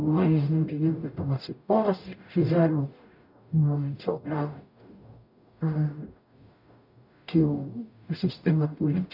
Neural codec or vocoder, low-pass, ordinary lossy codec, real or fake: codec, 44.1 kHz, 0.9 kbps, DAC; 5.4 kHz; none; fake